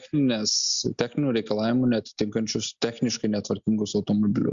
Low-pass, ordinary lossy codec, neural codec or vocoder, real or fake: 7.2 kHz; Opus, 64 kbps; none; real